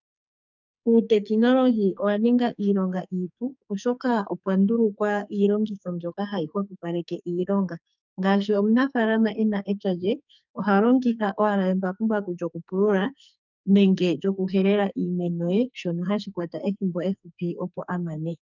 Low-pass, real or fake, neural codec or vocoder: 7.2 kHz; fake; codec, 44.1 kHz, 2.6 kbps, SNAC